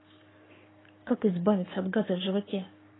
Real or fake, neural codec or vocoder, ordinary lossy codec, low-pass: fake; codec, 44.1 kHz, 7.8 kbps, Pupu-Codec; AAC, 16 kbps; 7.2 kHz